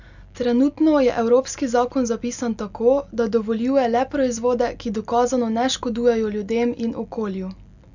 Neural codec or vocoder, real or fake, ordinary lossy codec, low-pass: none; real; none; 7.2 kHz